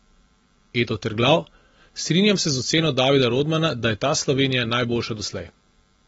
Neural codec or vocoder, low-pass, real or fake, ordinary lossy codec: none; 10.8 kHz; real; AAC, 24 kbps